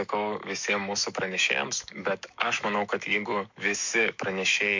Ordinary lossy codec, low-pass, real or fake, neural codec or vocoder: MP3, 48 kbps; 7.2 kHz; fake; autoencoder, 48 kHz, 128 numbers a frame, DAC-VAE, trained on Japanese speech